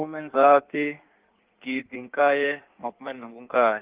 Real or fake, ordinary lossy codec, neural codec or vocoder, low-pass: fake; Opus, 16 kbps; codec, 16 kHz in and 24 kHz out, 1.1 kbps, FireRedTTS-2 codec; 3.6 kHz